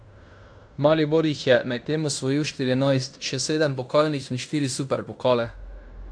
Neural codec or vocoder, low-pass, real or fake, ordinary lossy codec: codec, 16 kHz in and 24 kHz out, 0.9 kbps, LongCat-Audio-Codec, fine tuned four codebook decoder; 9.9 kHz; fake; AAC, 64 kbps